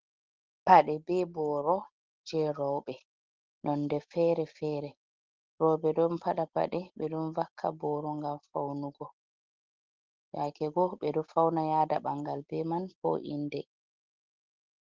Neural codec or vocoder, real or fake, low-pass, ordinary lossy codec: none; real; 7.2 kHz; Opus, 16 kbps